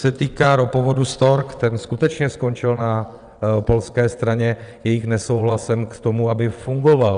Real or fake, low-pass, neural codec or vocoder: fake; 9.9 kHz; vocoder, 22.05 kHz, 80 mel bands, WaveNeXt